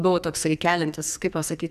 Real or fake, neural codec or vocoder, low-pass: fake; codec, 44.1 kHz, 2.6 kbps, SNAC; 14.4 kHz